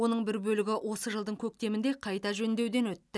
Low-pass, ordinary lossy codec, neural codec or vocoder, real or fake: none; none; none; real